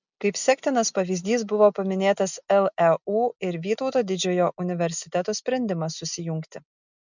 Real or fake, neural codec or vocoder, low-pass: real; none; 7.2 kHz